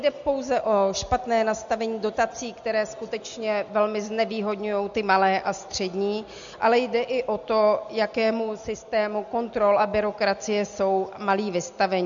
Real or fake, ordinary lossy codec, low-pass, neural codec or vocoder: real; MP3, 64 kbps; 7.2 kHz; none